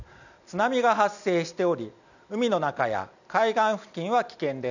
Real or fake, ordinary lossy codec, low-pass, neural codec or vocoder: real; none; 7.2 kHz; none